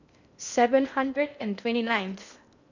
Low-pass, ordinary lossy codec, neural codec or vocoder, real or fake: 7.2 kHz; none; codec, 16 kHz in and 24 kHz out, 0.8 kbps, FocalCodec, streaming, 65536 codes; fake